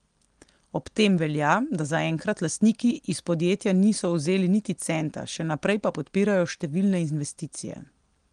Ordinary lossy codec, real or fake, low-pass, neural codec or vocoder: Opus, 24 kbps; real; 9.9 kHz; none